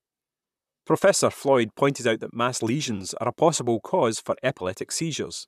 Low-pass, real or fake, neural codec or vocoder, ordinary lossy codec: 14.4 kHz; fake; vocoder, 44.1 kHz, 128 mel bands, Pupu-Vocoder; none